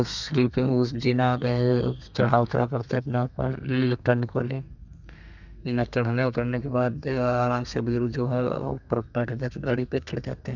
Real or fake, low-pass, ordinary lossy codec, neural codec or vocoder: fake; 7.2 kHz; none; codec, 32 kHz, 1.9 kbps, SNAC